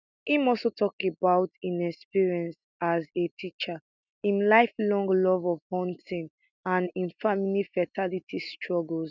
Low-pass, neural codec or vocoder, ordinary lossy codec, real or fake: 7.2 kHz; none; none; real